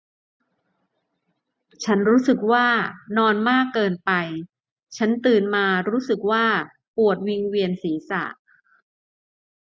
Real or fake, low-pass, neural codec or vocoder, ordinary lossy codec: real; none; none; none